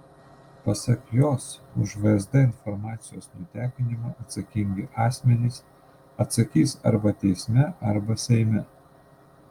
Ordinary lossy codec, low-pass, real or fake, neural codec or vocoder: Opus, 32 kbps; 19.8 kHz; real; none